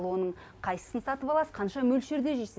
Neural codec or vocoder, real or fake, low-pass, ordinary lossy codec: none; real; none; none